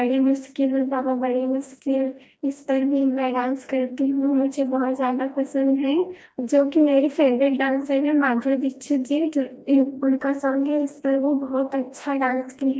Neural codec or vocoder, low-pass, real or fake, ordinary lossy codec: codec, 16 kHz, 1 kbps, FreqCodec, smaller model; none; fake; none